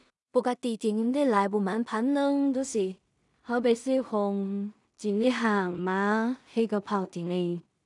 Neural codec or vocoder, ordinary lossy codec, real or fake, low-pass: codec, 16 kHz in and 24 kHz out, 0.4 kbps, LongCat-Audio-Codec, two codebook decoder; none; fake; 10.8 kHz